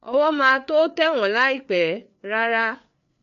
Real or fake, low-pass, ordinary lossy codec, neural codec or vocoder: fake; 7.2 kHz; none; codec, 16 kHz, 4 kbps, FreqCodec, larger model